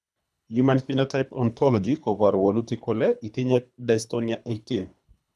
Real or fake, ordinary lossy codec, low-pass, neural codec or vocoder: fake; none; none; codec, 24 kHz, 3 kbps, HILCodec